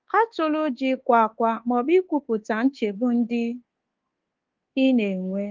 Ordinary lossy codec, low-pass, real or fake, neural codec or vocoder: Opus, 16 kbps; 7.2 kHz; fake; codec, 24 kHz, 1.2 kbps, DualCodec